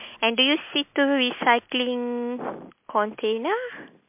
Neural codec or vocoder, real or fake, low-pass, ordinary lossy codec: none; real; 3.6 kHz; MP3, 32 kbps